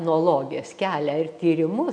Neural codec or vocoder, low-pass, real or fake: none; 9.9 kHz; real